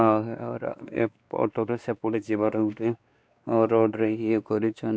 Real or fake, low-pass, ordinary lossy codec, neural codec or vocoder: fake; none; none; codec, 16 kHz, 2 kbps, X-Codec, WavLM features, trained on Multilingual LibriSpeech